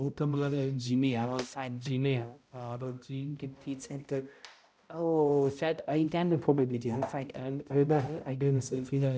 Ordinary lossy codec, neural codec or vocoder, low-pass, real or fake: none; codec, 16 kHz, 0.5 kbps, X-Codec, HuBERT features, trained on balanced general audio; none; fake